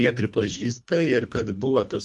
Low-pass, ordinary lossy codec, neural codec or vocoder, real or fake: 10.8 kHz; MP3, 96 kbps; codec, 24 kHz, 1.5 kbps, HILCodec; fake